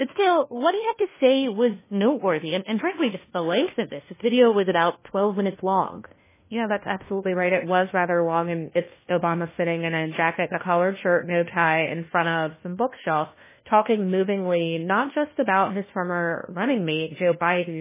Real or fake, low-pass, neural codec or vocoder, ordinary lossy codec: fake; 3.6 kHz; codec, 16 kHz, 1 kbps, FunCodec, trained on LibriTTS, 50 frames a second; MP3, 16 kbps